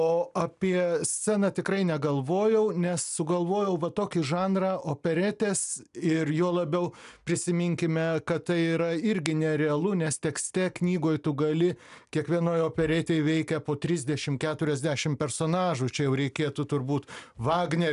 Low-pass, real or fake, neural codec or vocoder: 10.8 kHz; real; none